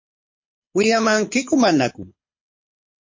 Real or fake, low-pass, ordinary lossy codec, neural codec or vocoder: fake; 7.2 kHz; MP3, 32 kbps; codec, 24 kHz, 6 kbps, HILCodec